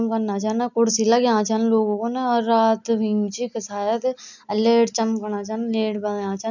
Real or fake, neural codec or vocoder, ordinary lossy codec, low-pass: real; none; none; 7.2 kHz